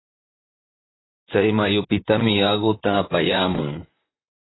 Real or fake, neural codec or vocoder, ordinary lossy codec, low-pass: fake; vocoder, 44.1 kHz, 128 mel bands, Pupu-Vocoder; AAC, 16 kbps; 7.2 kHz